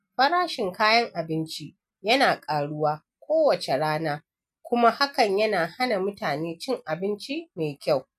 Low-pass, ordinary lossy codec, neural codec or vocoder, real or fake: 14.4 kHz; none; none; real